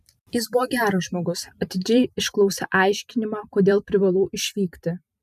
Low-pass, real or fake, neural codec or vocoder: 14.4 kHz; fake; vocoder, 44.1 kHz, 128 mel bands every 512 samples, BigVGAN v2